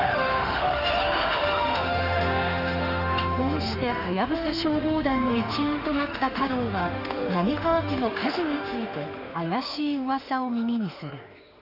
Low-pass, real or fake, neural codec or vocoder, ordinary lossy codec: 5.4 kHz; fake; autoencoder, 48 kHz, 32 numbers a frame, DAC-VAE, trained on Japanese speech; none